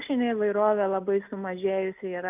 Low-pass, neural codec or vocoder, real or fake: 3.6 kHz; none; real